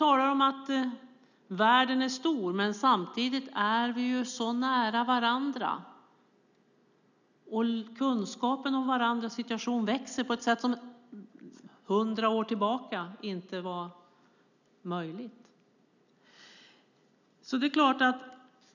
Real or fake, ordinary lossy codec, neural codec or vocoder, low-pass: real; none; none; 7.2 kHz